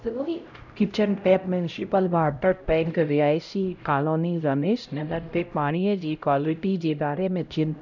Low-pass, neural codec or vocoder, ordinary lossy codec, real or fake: 7.2 kHz; codec, 16 kHz, 0.5 kbps, X-Codec, HuBERT features, trained on LibriSpeech; none; fake